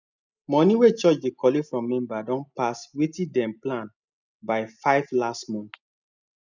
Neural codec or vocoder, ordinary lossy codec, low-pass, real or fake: none; none; 7.2 kHz; real